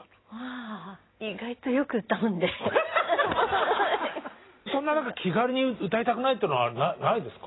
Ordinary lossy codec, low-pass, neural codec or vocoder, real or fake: AAC, 16 kbps; 7.2 kHz; none; real